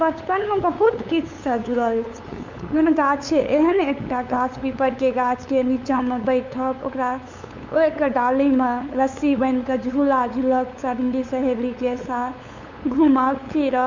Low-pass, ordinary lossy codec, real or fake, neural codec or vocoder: 7.2 kHz; MP3, 64 kbps; fake; codec, 16 kHz, 8 kbps, FunCodec, trained on LibriTTS, 25 frames a second